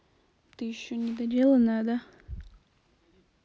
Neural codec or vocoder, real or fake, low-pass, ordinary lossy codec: none; real; none; none